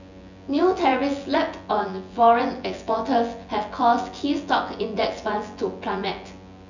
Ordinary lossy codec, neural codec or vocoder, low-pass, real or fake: none; vocoder, 24 kHz, 100 mel bands, Vocos; 7.2 kHz; fake